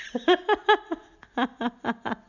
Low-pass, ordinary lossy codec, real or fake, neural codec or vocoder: 7.2 kHz; none; real; none